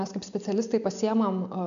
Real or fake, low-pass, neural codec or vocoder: real; 7.2 kHz; none